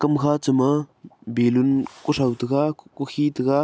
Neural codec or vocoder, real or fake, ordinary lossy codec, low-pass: none; real; none; none